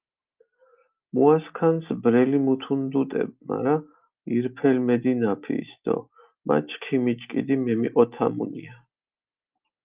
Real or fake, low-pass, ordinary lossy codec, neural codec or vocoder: real; 3.6 kHz; Opus, 32 kbps; none